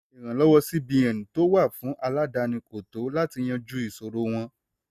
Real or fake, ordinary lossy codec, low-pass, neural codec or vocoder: real; none; 14.4 kHz; none